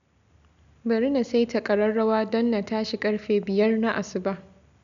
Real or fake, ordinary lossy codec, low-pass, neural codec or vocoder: real; none; 7.2 kHz; none